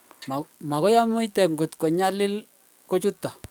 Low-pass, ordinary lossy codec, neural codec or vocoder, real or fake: none; none; codec, 44.1 kHz, 7.8 kbps, DAC; fake